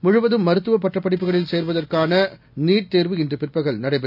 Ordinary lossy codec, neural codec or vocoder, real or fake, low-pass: none; none; real; 5.4 kHz